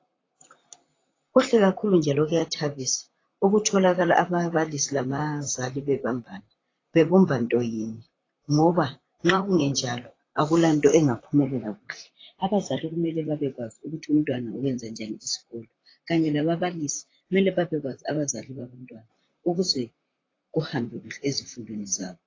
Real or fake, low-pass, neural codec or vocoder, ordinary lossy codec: fake; 7.2 kHz; vocoder, 44.1 kHz, 128 mel bands, Pupu-Vocoder; AAC, 32 kbps